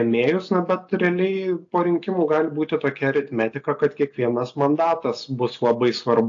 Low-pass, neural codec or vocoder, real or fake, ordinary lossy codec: 7.2 kHz; none; real; AAC, 48 kbps